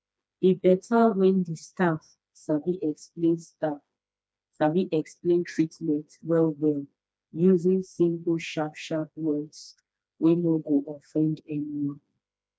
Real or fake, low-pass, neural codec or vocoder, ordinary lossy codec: fake; none; codec, 16 kHz, 2 kbps, FreqCodec, smaller model; none